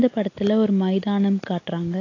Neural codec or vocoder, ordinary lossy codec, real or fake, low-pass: none; AAC, 32 kbps; real; 7.2 kHz